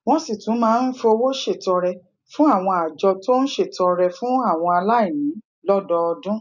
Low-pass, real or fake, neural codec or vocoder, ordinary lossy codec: 7.2 kHz; real; none; none